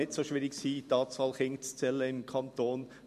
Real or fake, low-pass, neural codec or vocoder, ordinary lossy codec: fake; 14.4 kHz; vocoder, 44.1 kHz, 128 mel bands every 256 samples, BigVGAN v2; MP3, 64 kbps